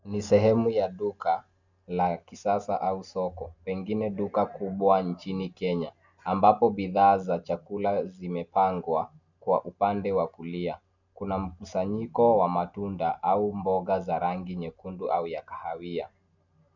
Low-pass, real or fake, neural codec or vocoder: 7.2 kHz; real; none